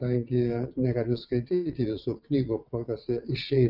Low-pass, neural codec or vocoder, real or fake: 5.4 kHz; vocoder, 22.05 kHz, 80 mel bands, WaveNeXt; fake